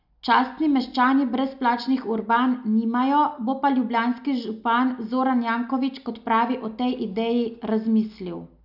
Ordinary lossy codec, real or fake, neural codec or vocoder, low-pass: none; real; none; 5.4 kHz